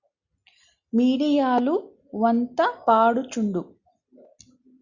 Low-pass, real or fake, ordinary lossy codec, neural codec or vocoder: 7.2 kHz; real; Opus, 64 kbps; none